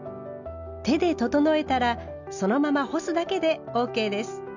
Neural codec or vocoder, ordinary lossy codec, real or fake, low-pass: none; none; real; 7.2 kHz